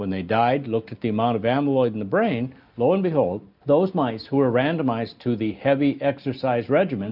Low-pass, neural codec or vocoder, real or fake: 5.4 kHz; none; real